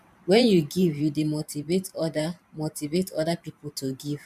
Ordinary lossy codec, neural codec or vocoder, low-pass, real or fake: none; vocoder, 44.1 kHz, 128 mel bands every 512 samples, BigVGAN v2; 14.4 kHz; fake